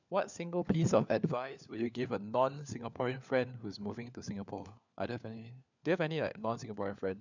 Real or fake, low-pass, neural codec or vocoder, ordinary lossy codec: fake; 7.2 kHz; codec, 16 kHz, 4 kbps, FunCodec, trained on LibriTTS, 50 frames a second; none